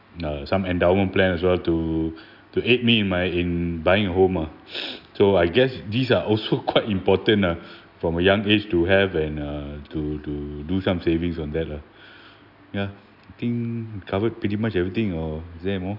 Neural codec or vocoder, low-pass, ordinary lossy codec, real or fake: none; 5.4 kHz; none; real